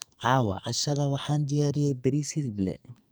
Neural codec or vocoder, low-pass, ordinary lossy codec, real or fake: codec, 44.1 kHz, 2.6 kbps, SNAC; none; none; fake